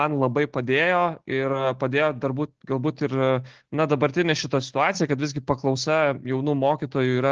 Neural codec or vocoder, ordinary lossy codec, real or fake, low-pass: none; Opus, 16 kbps; real; 7.2 kHz